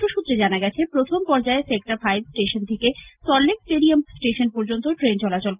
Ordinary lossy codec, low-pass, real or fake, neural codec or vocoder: Opus, 24 kbps; 3.6 kHz; real; none